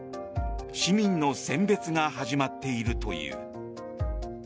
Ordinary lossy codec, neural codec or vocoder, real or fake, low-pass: none; none; real; none